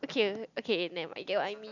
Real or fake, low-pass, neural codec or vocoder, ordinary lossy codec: real; 7.2 kHz; none; none